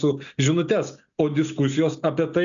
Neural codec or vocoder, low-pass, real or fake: none; 7.2 kHz; real